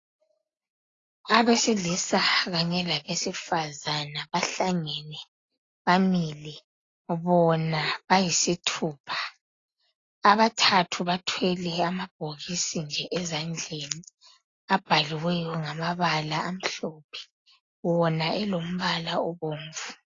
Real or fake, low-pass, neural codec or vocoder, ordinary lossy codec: real; 7.2 kHz; none; AAC, 32 kbps